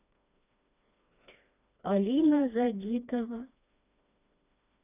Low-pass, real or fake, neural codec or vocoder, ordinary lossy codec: 3.6 kHz; fake; codec, 16 kHz, 2 kbps, FreqCodec, smaller model; none